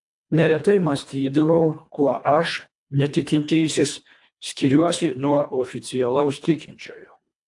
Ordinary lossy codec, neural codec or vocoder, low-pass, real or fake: AAC, 64 kbps; codec, 24 kHz, 1.5 kbps, HILCodec; 10.8 kHz; fake